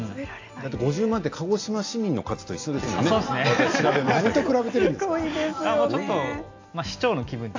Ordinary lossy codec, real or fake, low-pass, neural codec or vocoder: AAC, 48 kbps; real; 7.2 kHz; none